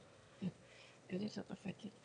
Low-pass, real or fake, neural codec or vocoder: 9.9 kHz; fake; autoencoder, 22.05 kHz, a latent of 192 numbers a frame, VITS, trained on one speaker